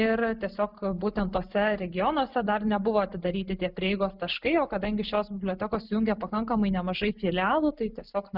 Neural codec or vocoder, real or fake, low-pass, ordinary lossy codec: none; real; 5.4 kHz; Opus, 64 kbps